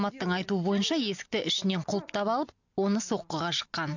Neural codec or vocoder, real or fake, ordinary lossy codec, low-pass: none; real; none; 7.2 kHz